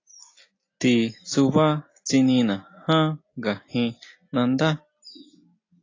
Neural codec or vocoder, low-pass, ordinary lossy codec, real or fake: none; 7.2 kHz; AAC, 32 kbps; real